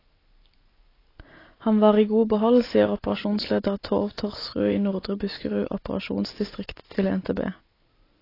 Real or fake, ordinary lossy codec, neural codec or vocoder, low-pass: real; AAC, 24 kbps; none; 5.4 kHz